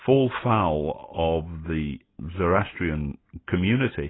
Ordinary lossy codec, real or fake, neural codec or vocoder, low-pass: AAC, 16 kbps; real; none; 7.2 kHz